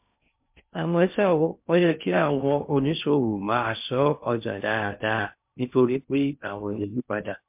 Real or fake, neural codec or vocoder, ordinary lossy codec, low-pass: fake; codec, 16 kHz in and 24 kHz out, 0.6 kbps, FocalCodec, streaming, 2048 codes; MP3, 32 kbps; 3.6 kHz